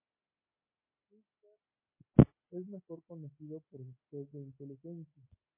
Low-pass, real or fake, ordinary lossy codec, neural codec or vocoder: 3.6 kHz; real; MP3, 32 kbps; none